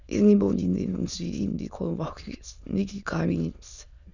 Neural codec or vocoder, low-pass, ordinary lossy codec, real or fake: autoencoder, 22.05 kHz, a latent of 192 numbers a frame, VITS, trained on many speakers; 7.2 kHz; none; fake